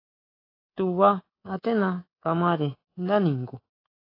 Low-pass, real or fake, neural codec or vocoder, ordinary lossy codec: 5.4 kHz; fake; codec, 24 kHz, 3.1 kbps, DualCodec; AAC, 24 kbps